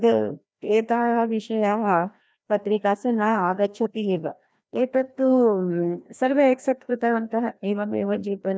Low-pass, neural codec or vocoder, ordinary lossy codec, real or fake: none; codec, 16 kHz, 1 kbps, FreqCodec, larger model; none; fake